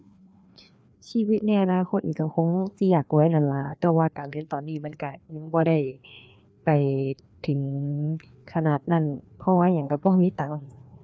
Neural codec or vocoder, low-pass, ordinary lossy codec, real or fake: codec, 16 kHz, 2 kbps, FreqCodec, larger model; none; none; fake